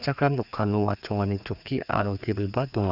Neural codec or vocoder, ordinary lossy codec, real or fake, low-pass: codec, 16 kHz, 2 kbps, FreqCodec, larger model; none; fake; 5.4 kHz